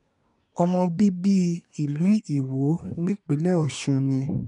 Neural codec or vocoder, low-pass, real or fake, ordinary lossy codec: codec, 24 kHz, 1 kbps, SNAC; 10.8 kHz; fake; none